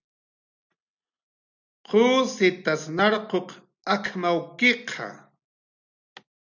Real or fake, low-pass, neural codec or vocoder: real; 7.2 kHz; none